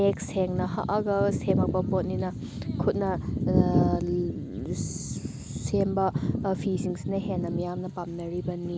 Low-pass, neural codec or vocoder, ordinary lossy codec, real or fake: none; none; none; real